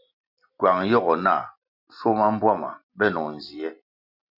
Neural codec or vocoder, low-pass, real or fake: none; 5.4 kHz; real